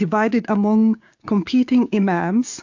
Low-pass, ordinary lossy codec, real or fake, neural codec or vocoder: 7.2 kHz; AAC, 48 kbps; fake; codec, 16 kHz, 6 kbps, DAC